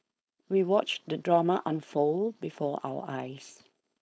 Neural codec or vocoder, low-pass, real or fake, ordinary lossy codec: codec, 16 kHz, 4.8 kbps, FACodec; none; fake; none